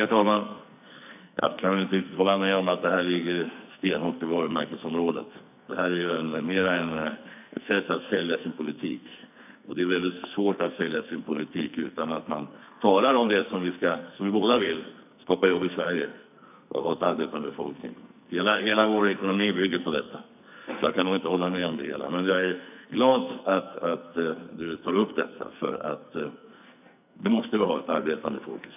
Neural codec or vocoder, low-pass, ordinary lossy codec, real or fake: codec, 44.1 kHz, 2.6 kbps, SNAC; 3.6 kHz; none; fake